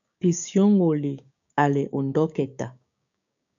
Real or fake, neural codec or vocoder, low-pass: fake; codec, 16 kHz, 6 kbps, DAC; 7.2 kHz